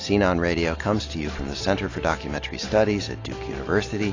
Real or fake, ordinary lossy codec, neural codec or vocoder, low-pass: real; AAC, 32 kbps; none; 7.2 kHz